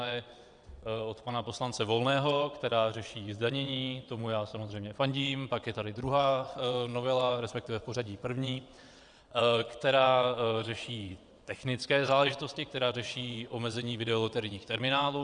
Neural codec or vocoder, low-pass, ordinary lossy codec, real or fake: vocoder, 22.05 kHz, 80 mel bands, WaveNeXt; 9.9 kHz; Opus, 64 kbps; fake